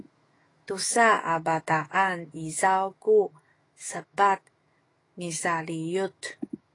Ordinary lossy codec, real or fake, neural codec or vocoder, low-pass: AAC, 32 kbps; fake; autoencoder, 48 kHz, 128 numbers a frame, DAC-VAE, trained on Japanese speech; 10.8 kHz